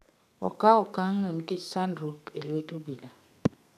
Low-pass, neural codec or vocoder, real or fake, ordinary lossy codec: 14.4 kHz; codec, 32 kHz, 1.9 kbps, SNAC; fake; none